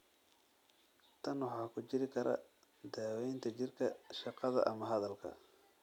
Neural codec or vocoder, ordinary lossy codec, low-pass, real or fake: none; none; 19.8 kHz; real